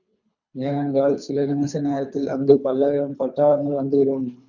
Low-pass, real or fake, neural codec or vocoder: 7.2 kHz; fake; codec, 24 kHz, 3 kbps, HILCodec